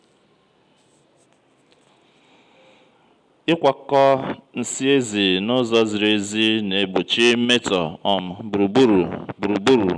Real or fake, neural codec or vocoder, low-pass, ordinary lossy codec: real; none; 9.9 kHz; none